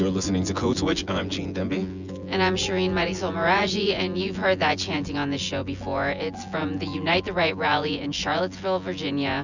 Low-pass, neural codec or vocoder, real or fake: 7.2 kHz; vocoder, 24 kHz, 100 mel bands, Vocos; fake